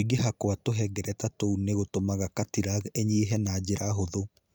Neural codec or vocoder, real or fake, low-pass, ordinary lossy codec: none; real; none; none